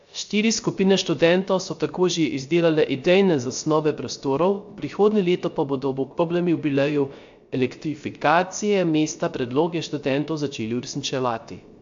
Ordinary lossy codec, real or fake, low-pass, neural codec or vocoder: AAC, 64 kbps; fake; 7.2 kHz; codec, 16 kHz, 0.3 kbps, FocalCodec